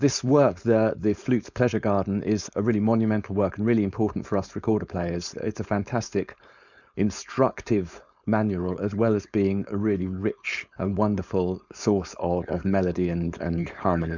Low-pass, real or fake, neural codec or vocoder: 7.2 kHz; fake; codec, 16 kHz, 4.8 kbps, FACodec